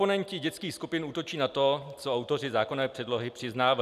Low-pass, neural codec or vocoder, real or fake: 14.4 kHz; none; real